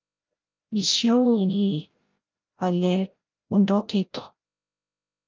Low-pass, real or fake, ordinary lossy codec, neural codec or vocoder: 7.2 kHz; fake; Opus, 24 kbps; codec, 16 kHz, 0.5 kbps, FreqCodec, larger model